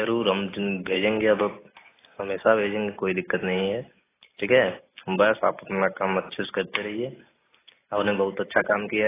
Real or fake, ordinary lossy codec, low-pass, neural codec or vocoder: real; AAC, 16 kbps; 3.6 kHz; none